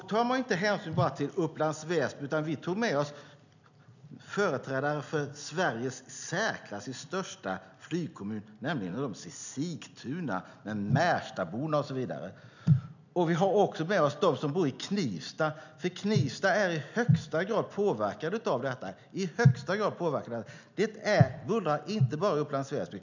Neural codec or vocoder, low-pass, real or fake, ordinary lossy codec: none; 7.2 kHz; real; none